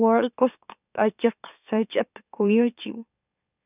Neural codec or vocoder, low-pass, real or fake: autoencoder, 44.1 kHz, a latent of 192 numbers a frame, MeloTTS; 3.6 kHz; fake